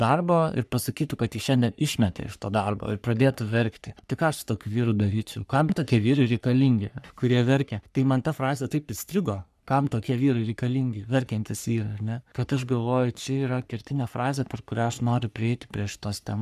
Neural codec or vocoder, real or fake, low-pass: codec, 44.1 kHz, 3.4 kbps, Pupu-Codec; fake; 14.4 kHz